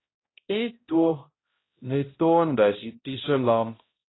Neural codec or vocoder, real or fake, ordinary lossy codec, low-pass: codec, 16 kHz, 0.5 kbps, X-Codec, HuBERT features, trained on balanced general audio; fake; AAC, 16 kbps; 7.2 kHz